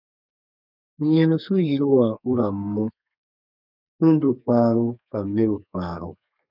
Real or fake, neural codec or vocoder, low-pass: fake; codec, 32 kHz, 1.9 kbps, SNAC; 5.4 kHz